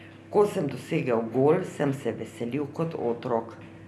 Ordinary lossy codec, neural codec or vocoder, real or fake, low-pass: none; none; real; none